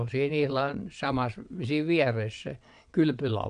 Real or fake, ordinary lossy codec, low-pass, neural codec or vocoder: fake; none; 9.9 kHz; vocoder, 22.05 kHz, 80 mel bands, WaveNeXt